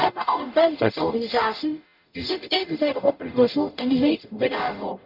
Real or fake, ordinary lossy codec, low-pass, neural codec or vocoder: fake; none; 5.4 kHz; codec, 44.1 kHz, 0.9 kbps, DAC